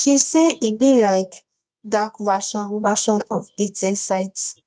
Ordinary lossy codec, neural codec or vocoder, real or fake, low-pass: none; codec, 24 kHz, 0.9 kbps, WavTokenizer, medium music audio release; fake; 9.9 kHz